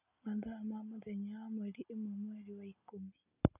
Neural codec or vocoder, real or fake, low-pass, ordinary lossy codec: none; real; 3.6 kHz; none